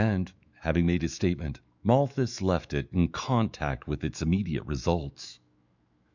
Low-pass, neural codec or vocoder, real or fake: 7.2 kHz; autoencoder, 48 kHz, 128 numbers a frame, DAC-VAE, trained on Japanese speech; fake